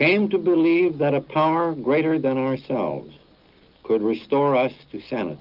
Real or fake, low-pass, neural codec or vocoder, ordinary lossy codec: real; 5.4 kHz; none; Opus, 24 kbps